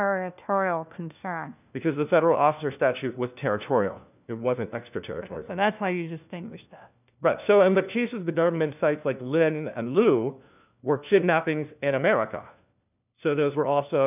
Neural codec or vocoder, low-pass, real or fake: codec, 16 kHz, 1 kbps, FunCodec, trained on LibriTTS, 50 frames a second; 3.6 kHz; fake